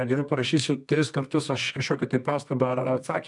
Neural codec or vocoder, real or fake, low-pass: codec, 24 kHz, 0.9 kbps, WavTokenizer, medium music audio release; fake; 10.8 kHz